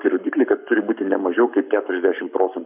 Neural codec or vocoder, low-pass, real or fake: codec, 44.1 kHz, 7.8 kbps, Pupu-Codec; 3.6 kHz; fake